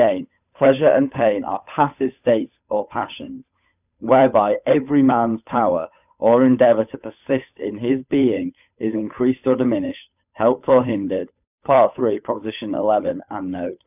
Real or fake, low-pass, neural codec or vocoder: fake; 3.6 kHz; codec, 16 kHz, 8 kbps, FunCodec, trained on Chinese and English, 25 frames a second